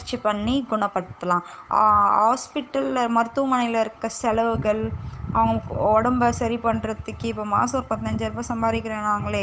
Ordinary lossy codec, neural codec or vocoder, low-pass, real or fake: none; codec, 16 kHz, 8 kbps, FunCodec, trained on Chinese and English, 25 frames a second; none; fake